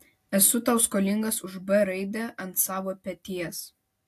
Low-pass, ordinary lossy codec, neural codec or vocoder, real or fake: 14.4 kHz; AAC, 64 kbps; none; real